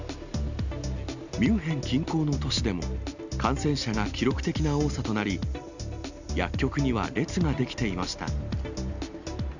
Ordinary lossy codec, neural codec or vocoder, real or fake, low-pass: none; none; real; 7.2 kHz